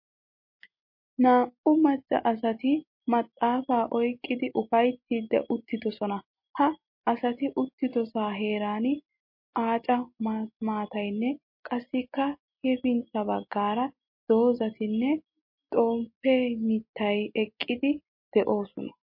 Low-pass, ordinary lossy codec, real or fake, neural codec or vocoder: 5.4 kHz; MP3, 32 kbps; real; none